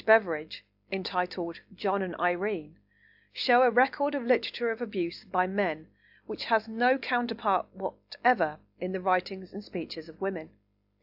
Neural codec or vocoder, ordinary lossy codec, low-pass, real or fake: none; AAC, 48 kbps; 5.4 kHz; real